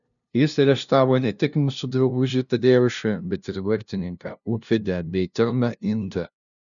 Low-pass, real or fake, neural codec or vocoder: 7.2 kHz; fake; codec, 16 kHz, 0.5 kbps, FunCodec, trained on LibriTTS, 25 frames a second